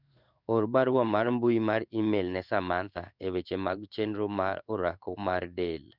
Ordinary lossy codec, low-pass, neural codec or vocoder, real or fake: none; 5.4 kHz; codec, 16 kHz in and 24 kHz out, 1 kbps, XY-Tokenizer; fake